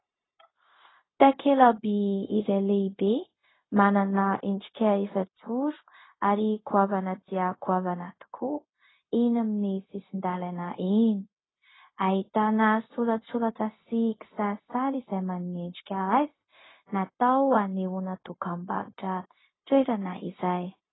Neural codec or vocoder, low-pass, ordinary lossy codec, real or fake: codec, 16 kHz, 0.4 kbps, LongCat-Audio-Codec; 7.2 kHz; AAC, 16 kbps; fake